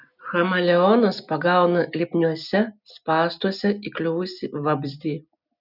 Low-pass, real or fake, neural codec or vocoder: 5.4 kHz; real; none